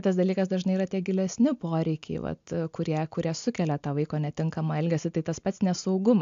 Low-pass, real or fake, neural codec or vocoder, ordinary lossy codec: 7.2 kHz; real; none; AAC, 64 kbps